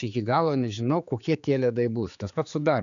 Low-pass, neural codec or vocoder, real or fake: 7.2 kHz; codec, 16 kHz, 4 kbps, X-Codec, HuBERT features, trained on general audio; fake